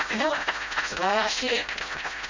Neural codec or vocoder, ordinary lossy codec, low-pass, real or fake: codec, 16 kHz, 0.5 kbps, FreqCodec, smaller model; MP3, 48 kbps; 7.2 kHz; fake